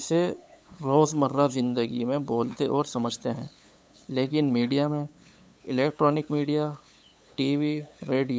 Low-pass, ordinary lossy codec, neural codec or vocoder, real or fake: none; none; codec, 16 kHz, 8 kbps, FunCodec, trained on LibriTTS, 25 frames a second; fake